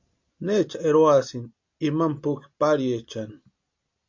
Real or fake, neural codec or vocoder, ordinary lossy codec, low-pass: real; none; MP3, 48 kbps; 7.2 kHz